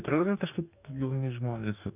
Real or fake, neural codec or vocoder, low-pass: fake; codec, 44.1 kHz, 2.6 kbps, DAC; 3.6 kHz